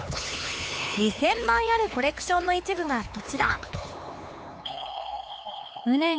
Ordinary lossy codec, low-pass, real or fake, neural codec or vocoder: none; none; fake; codec, 16 kHz, 4 kbps, X-Codec, HuBERT features, trained on LibriSpeech